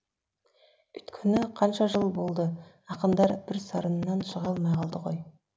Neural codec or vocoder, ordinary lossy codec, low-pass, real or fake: none; none; none; real